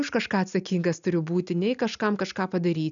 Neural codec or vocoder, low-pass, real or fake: none; 7.2 kHz; real